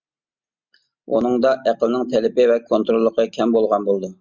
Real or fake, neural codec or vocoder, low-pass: real; none; 7.2 kHz